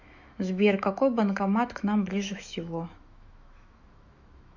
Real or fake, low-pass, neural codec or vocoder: fake; 7.2 kHz; autoencoder, 48 kHz, 128 numbers a frame, DAC-VAE, trained on Japanese speech